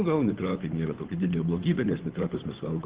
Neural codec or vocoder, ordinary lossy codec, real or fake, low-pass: codec, 16 kHz, 8 kbps, FunCodec, trained on Chinese and English, 25 frames a second; Opus, 16 kbps; fake; 3.6 kHz